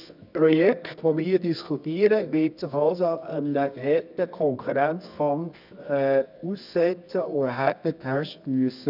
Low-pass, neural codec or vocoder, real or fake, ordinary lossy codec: 5.4 kHz; codec, 24 kHz, 0.9 kbps, WavTokenizer, medium music audio release; fake; none